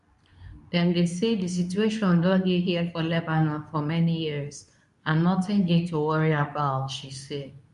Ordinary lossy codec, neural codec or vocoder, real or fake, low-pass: none; codec, 24 kHz, 0.9 kbps, WavTokenizer, medium speech release version 2; fake; 10.8 kHz